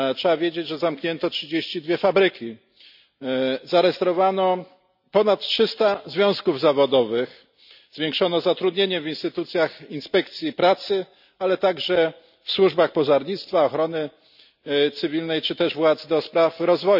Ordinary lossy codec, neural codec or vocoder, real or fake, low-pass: none; none; real; 5.4 kHz